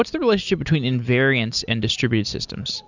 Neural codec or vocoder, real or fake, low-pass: none; real; 7.2 kHz